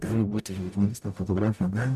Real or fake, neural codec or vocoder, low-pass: fake; codec, 44.1 kHz, 0.9 kbps, DAC; 14.4 kHz